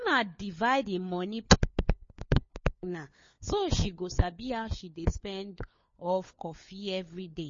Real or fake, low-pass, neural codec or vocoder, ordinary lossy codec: fake; 7.2 kHz; codec, 16 kHz, 4 kbps, FunCodec, trained on LibriTTS, 50 frames a second; MP3, 32 kbps